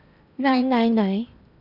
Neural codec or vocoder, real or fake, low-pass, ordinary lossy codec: codec, 16 kHz in and 24 kHz out, 0.8 kbps, FocalCodec, streaming, 65536 codes; fake; 5.4 kHz; none